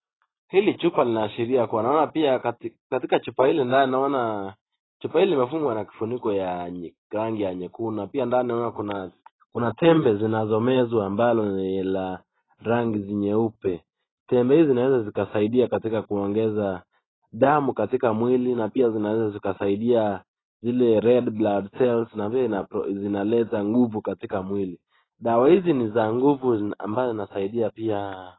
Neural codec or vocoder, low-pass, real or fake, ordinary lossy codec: none; 7.2 kHz; real; AAC, 16 kbps